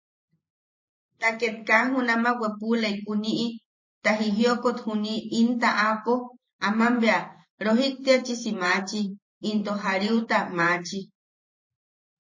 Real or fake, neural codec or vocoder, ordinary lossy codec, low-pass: fake; vocoder, 44.1 kHz, 128 mel bands every 512 samples, BigVGAN v2; MP3, 32 kbps; 7.2 kHz